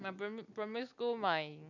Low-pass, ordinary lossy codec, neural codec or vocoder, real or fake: 7.2 kHz; none; none; real